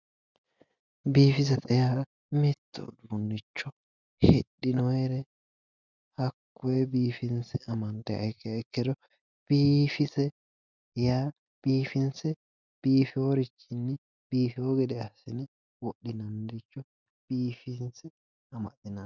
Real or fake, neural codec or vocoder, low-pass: real; none; 7.2 kHz